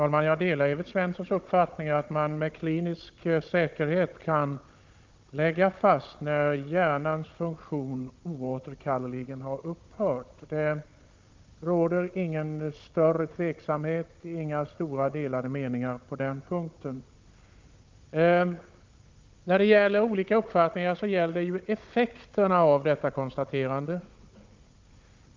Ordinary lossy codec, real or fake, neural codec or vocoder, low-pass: Opus, 24 kbps; fake; codec, 16 kHz, 16 kbps, FunCodec, trained on Chinese and English, 50 frames a second; 7.2 kHz